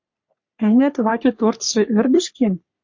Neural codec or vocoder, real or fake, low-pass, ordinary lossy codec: codec, 44.1 kHz, 3.4 kbps, Pupu-Codec; fake; 7.2 kHz; MP3, 48 kbps